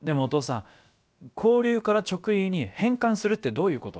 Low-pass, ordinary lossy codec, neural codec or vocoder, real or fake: none; none; codec, 16 kHz, about 1 kbps, DyCAST, with the encoder's durations; fake